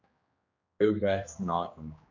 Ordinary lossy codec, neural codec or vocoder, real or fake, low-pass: MP3, 64 kbps; codec, 16 kHz, 2 kbps, X-Codec, HuBERT features, trained on general audio; fake; 7.2 kHz